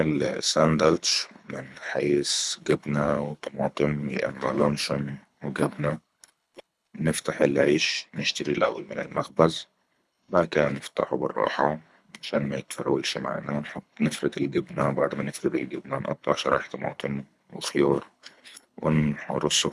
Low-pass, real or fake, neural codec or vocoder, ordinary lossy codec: none; fake; codec, 24 kHz, 3 kbps, HILCodec; none